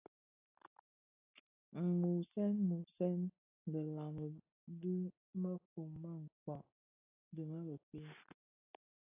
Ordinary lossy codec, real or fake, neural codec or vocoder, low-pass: AAC, 24 kbps; real; none; 3.6 kHz